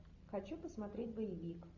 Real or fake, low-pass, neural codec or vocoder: real; 7.2 kHz; none